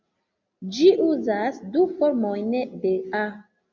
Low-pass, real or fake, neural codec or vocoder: 7.2 kHz; real; none